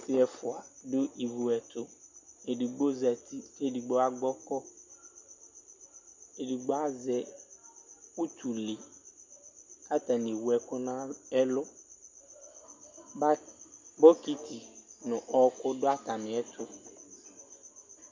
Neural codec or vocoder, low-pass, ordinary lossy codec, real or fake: none; 7.2 kHz; MP3, 64 kbps; real